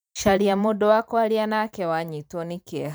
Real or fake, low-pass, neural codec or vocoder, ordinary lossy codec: fake; none; vocoder, 44.1 kHz, 128 mel bands every 512 samples, BigVGAN v2; none